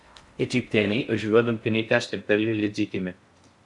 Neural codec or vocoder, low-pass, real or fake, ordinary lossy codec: codec, 16 kHz in and 24 kHz out, 0.6 kbps, FocalCodec, streaming, 4096 codes; 10.8 kHz; fake; Opus, 64 kbps